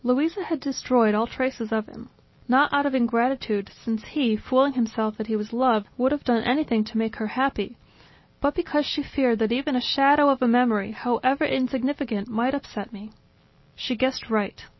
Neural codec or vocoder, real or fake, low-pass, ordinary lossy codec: none; real; 7.2 kHz; MP3, 24 kbps